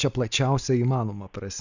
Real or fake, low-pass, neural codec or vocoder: real; 7.2 kHz; none